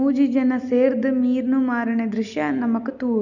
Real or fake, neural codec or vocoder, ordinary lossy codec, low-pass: real; none; none; 7.2 kHz